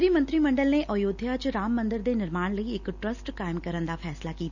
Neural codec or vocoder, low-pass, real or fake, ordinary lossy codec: none; 7.2 kHz; real; none